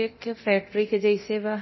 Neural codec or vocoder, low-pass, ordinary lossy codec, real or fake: codec, 24 kHz, 0.9 kbps, DualCodec; 7.2 kHz; MP3, 24 kbps; fake